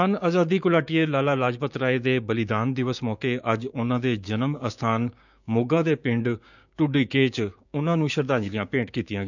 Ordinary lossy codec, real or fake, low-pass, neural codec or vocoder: none; fake; 7.2 kHz; codec, 16 kHz, 6 kbps, DAC